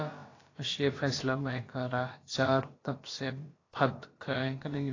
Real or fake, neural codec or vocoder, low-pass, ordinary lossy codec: fake; codec, 16 kHz, about 1 kbps, DyCAST, with the encoder's durations; 7.2 kHz; AAC, 32 kbps